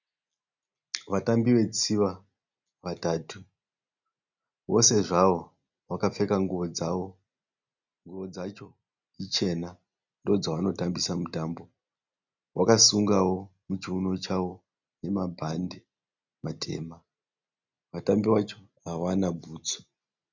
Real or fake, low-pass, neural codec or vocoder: real; 7.2 kHz; none